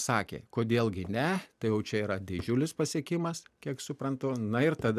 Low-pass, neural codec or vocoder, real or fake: 14.4 kHz; none; real